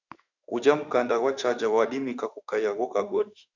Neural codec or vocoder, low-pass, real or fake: autoencoder, 48 kHz, 32 numbers a frame, DAC-VAE, trained on Japanese speech; 7.2 kHz; fake